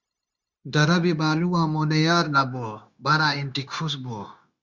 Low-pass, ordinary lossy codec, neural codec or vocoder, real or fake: 7.2 kHz; Opus, 64 kbps; codec, 16 kHz, 0.9 kbps, LongCat-Audio-Codec; fake